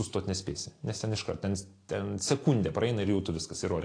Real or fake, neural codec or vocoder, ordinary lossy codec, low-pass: real; none; AAC, 48 kbps; 9.9 kHz